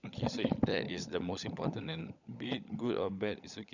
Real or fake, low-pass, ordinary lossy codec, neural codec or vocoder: fake; 7.2 kHz; none; codec, 16 kHz, 16 kbps, FunCodec, trained on LibriTTS, 50 frames a second